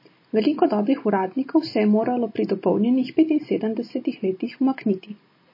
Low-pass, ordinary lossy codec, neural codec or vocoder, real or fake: 5.4 kHz; MP3, 24 kbps; none; real